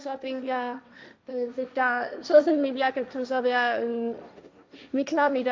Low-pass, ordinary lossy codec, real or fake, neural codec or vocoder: none; none; fake; codec, 16 kHz, 1.1 kbps, Voila-Tokenizer